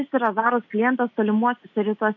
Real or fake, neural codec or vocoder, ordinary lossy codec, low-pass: real; none; MP3, 48 kbps; 7.2 kHz